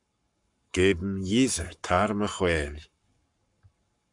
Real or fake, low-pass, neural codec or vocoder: fake; 10.8 kHz; codec, 44.1 kHz, 7.8 kbps, Pupu-Codec